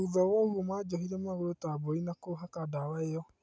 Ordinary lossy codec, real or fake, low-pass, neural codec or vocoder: none; real; none; none